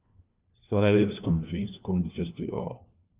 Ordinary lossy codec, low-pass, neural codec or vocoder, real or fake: Opus, 24 kbps; 3.6 kHz; codec, 16 kHz, 1 kbps, FunCodec, trained on LibriTTS, 50 frames a second; fake